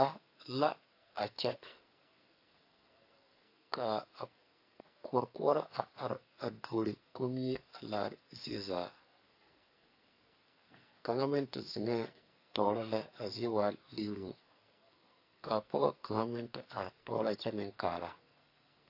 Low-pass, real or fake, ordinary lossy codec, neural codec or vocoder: 5.4 kHz; fake; AAC, 32 kbps; codec, 44.1 kHz, 2.6 kbps, SNAC